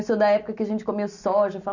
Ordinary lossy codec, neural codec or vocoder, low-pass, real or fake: none; none; 7.2 kHz; real